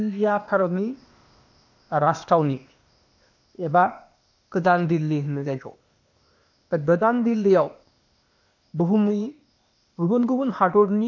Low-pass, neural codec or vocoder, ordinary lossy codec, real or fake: 7.2 kHz; codec, 16 kHz, 0.8 kbps, ZipCodec; none; fake